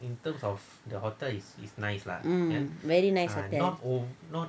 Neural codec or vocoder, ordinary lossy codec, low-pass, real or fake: none; none; none; real